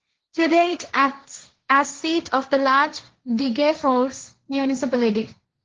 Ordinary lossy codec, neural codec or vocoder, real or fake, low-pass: Opus, 16 kbps; codec, 16 kHz, 1.1 kbps, Voila-Tokenizer; fake; 7.2 kHz